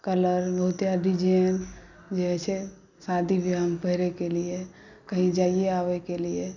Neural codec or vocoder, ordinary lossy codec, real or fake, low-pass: none; none; real; 7.2 kHz